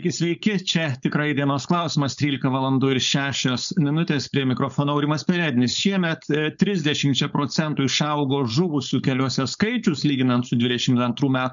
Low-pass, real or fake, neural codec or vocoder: 7.2 kHz; fake; codec, 16 kHz, 4.8 kbps, FACodec